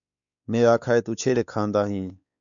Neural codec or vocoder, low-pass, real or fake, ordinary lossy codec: codec, 16 kHz, 4 kbps, X-Codec, WavLM features, trained on Multilingual LibriSpeech; 7.2 kHz; fake; MP3, 96 kbps